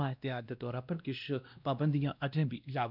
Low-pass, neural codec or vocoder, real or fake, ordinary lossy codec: 5.4 kHz; codec, 16 kHz, 1 kbps, X-Codec, WavLM features, trained on Multilingual LibriSpeech; fake; none